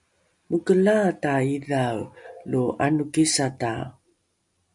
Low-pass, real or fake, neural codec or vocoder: 10.8 kHz; real; none